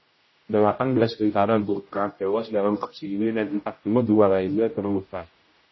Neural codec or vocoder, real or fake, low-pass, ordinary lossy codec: codec, 16 kHz, 0.5 kbps, X-Codec, HuBERT features, trained on general audio; fake; 7.2 kHz; MP3, 24 kbps